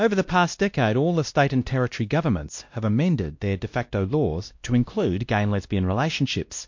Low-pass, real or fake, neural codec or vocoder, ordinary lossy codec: 7.2 kHz; fake; codec, 16 kHz, 1 kbps, X-Codec, WavLM features, trained on Multilingual LibriSpeech; MP3, 48 kbps